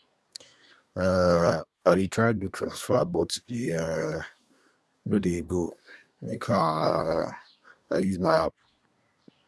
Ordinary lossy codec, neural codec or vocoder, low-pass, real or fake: none; codec, 24 kHz, 1 kbps, SNAC; none; fake